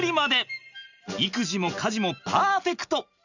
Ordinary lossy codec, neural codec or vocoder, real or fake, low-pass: none; none; real; 7.2 kHz